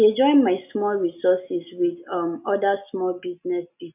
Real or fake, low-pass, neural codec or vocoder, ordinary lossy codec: real; 3.6 kHz; none; none